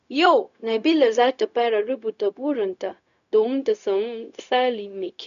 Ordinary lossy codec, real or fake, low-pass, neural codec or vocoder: none; fake; 7.2 kHz; codec, 16 kHz, 0.4 kbps, LongCat-Audio-Codec